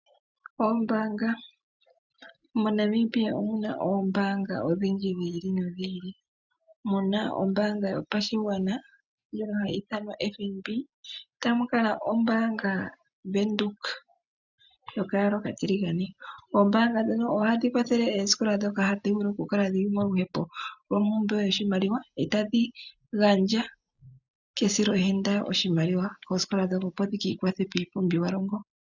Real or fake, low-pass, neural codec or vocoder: real; 7.2 kHz; none